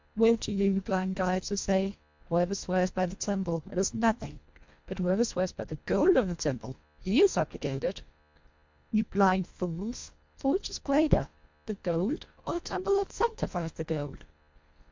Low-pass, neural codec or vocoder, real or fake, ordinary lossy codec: 7.2 kHz; codec, 24 kHz, 1.5 kbps, HILCodec; fake; MP3, 64 kbps